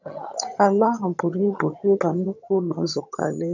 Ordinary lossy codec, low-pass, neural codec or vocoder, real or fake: none; 7.2 kHz; vocoder, 22.05 kHz, 80 mel bands, HiFi-GAN; fake